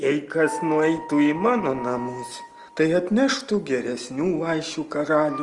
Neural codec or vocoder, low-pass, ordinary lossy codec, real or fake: none; 10.8 kHz; Opus, 24 kbps; real